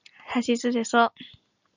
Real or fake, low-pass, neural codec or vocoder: real; 7.2 kHz; none